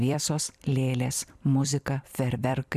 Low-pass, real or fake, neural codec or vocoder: 14.4 kHz; fake; vocoder, 44.1 kHz, 128 mel bands every 256 samples, BigVGAN v2